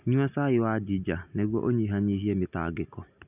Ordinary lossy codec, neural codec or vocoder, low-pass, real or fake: none; none; 3.6 kHz; real